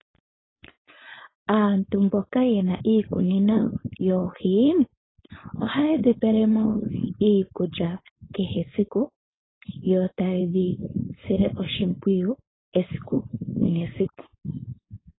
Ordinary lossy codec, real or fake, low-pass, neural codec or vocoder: AAC, 16 kbps; fake; 7.2 kHz; codec, 16 kHz, 4.8 kbps, FACodec